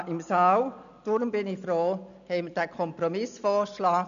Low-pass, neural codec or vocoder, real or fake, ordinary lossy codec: 7.2 kHz; none; real; MP3, 96 kbps